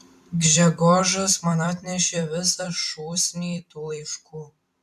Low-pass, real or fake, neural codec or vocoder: 14.4 kHz; fake; vocoder, 44.1 kHz, 128 mel bands every 256 samples, BigVGAN v2